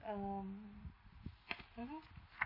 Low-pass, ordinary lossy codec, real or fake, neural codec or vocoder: 5.4 kHz; MP3, 24 kbps; real; none